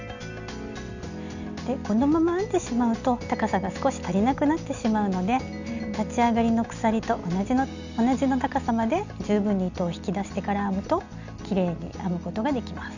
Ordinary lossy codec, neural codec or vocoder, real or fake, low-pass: none; none; real; 7.2 kHz